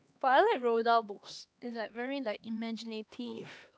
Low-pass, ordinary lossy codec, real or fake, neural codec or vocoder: none; none; fake; codec, 16 kHz, 2 kbps, X-Codec, HuBERT features, trained on LibriSpeech